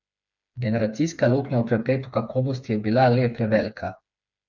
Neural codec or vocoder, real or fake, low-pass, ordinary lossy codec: codec, 16 kHz, 4 kbps, FreqCodec, smaller model; fake; 7.2 kHz; none